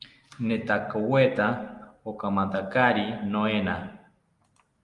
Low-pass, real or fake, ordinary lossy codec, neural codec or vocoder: 10.8 kHz; real; Opus, 32 kbps; none